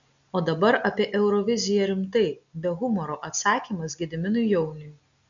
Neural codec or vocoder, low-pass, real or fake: none; 7.2 kHz; real